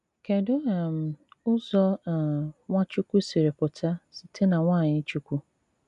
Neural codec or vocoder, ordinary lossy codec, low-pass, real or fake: none; none; 10.8 kHz; real